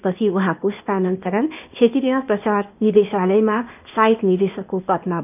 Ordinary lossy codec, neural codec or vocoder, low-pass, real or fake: none; codec, 16 kHz, 0.8 kbps, ZipCodec; 3.6 kHz; fake